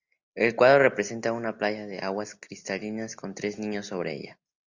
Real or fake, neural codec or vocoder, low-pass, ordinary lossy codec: real; none; 7.2 kHz; Opus, 64 kbps